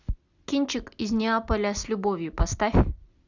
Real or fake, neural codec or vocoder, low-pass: real; none; 7.2 kHz